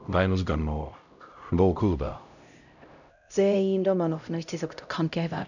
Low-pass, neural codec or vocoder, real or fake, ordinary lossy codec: 7.2 kHz; codec, 16 kHz, 0.5 kbps, X-Codec, HuBERT features, trained on LibriSpeech; fake; none